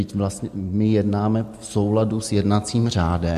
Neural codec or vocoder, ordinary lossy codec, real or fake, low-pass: none; MP3, 64 kbps; real; 14.4 kHz